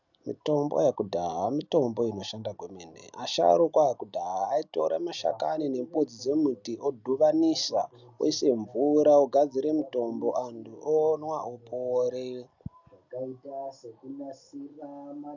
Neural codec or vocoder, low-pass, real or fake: none; 7.2 kHz; real